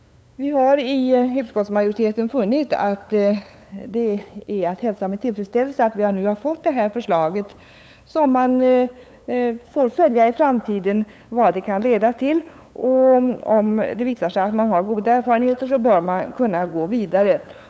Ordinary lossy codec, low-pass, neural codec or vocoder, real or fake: none; none; codec, 16 kHz, 8 kbps, FunCodec, trained on LibriTTS, 25 frames a second; fake